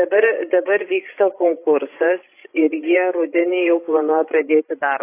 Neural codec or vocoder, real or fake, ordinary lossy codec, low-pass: codec, 16 kHz, 16 kbps, FreqCodec, larger model; fake; AAC, 24 kbps; 3.6 kHz